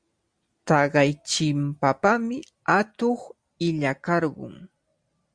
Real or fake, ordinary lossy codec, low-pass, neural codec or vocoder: real; Opus, 64 kbps; 9.9 kHz; none